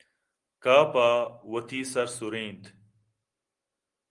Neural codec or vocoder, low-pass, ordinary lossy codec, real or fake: none; 10.8 kHz; Opus, 24 kbps; real